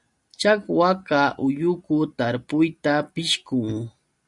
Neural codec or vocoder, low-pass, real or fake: none; 10.8 kHz; real